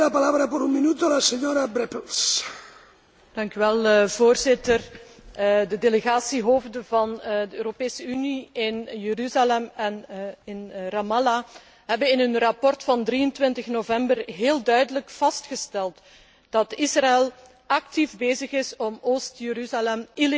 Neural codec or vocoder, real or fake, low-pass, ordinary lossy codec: none; real; none; none